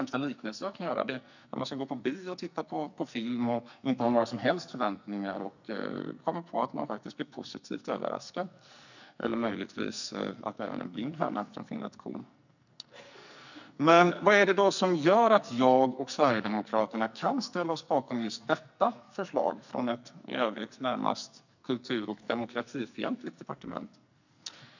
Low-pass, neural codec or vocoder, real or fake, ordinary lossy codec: 7.2 kHz; codec, 44.1 kHz, 2.6 kbps, SNAC; fake; none